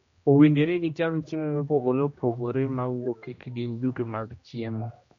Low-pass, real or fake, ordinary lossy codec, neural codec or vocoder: 7.2 kHz; fake; MP3, 48 kbps; codec, 16 kHz, 1 kbps, X-Codec, HuBERT features, trained on general audio